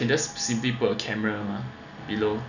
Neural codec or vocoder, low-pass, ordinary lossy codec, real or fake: none; 7.2 kHz; none; real